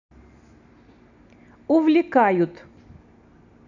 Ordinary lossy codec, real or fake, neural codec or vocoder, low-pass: none; real; none; 7.2 kHz